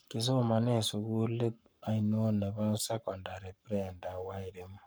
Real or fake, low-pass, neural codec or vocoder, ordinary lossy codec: fake; none; codec, 44.1 kHz, 7.8 kbps, Pupu-Codec; none